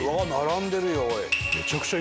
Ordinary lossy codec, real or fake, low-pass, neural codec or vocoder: none; real; none; none